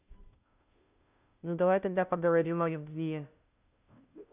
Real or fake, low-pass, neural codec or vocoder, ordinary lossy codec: fake; 3.6 kHz; codec, 16 kHz, 0.5 kbps, FunCodec, trained on Chinese and English, 25 frames a second; none